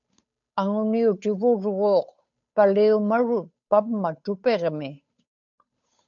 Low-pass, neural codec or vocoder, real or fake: 7.2 kHz; codec, 16 kHz, 8 kbps, FunCodec, trained on Chinese and English, 25 frames a second; fake